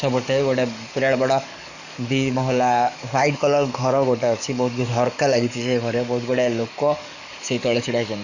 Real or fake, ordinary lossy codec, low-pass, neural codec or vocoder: fake; none; 7.2 kHz; codec, 44.1 kHz, 7.8 kbps, DAC